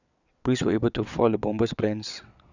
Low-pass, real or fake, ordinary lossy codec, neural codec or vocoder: 7.2 kHz; fake; none; vocoder, 22.05 kHz, 80 mel bands, WaveNeXt